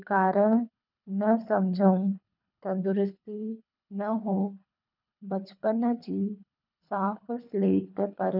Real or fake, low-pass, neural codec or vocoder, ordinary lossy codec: fake; 5.4 kHz; codec, 24 kHz, 3 kbps, HILCodec; none